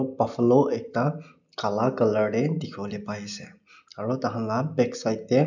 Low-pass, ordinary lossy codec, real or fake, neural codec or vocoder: 7.2 kHz; none; real; none